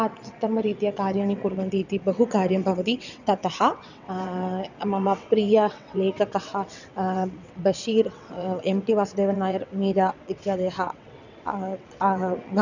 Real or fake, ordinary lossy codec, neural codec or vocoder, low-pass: fake; none; vocoder, 22.05 kHz, 80 mel bands, WaveNeXt; 7.2 kHz